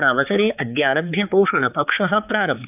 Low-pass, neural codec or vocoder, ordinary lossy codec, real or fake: 3.6 kHz; codec, 16 kHz, 4 kbps, X-Codec, HuBERT features, trained on balanced general audio; none; fake